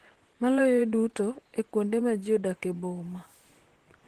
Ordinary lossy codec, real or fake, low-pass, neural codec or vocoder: Opus, 16 kbps; fake; 14.4 kHz; vocoder, 44.1 kHz, 128 mel bands, Pupu-Vocoder